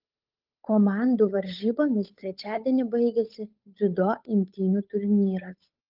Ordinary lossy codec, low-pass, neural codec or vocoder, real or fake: Opus, 24 kbps; 5.4 kHz; codec, 16 kHz, 8 kbps, FunCodec, trained on Chinese and English, 25 frames a second; fake